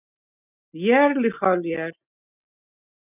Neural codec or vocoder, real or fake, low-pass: none; real; 3.6 kHz